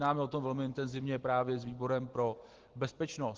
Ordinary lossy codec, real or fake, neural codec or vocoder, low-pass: Opus, 16 kbps; real; none; 7.2 kHz